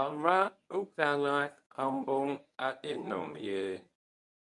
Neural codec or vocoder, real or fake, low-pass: codec, 24 kHz, 0.9 kbps, WavTokenizer, medium speech release version 1; fake; 10.8 kHz